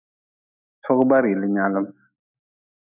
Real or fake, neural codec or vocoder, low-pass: fake; autoencoder, 48 kHz, 128 numbers a frame, DAC-VAE, trained on Japanese speech; 3.6 kHz